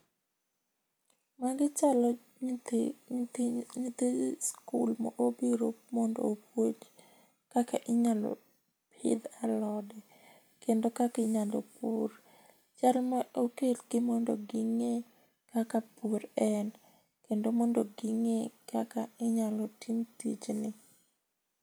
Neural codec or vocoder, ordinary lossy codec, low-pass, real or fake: none; none; none; real